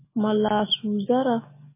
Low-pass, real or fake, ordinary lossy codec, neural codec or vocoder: 3.6 kHz; real; MP3, 16 kbps; none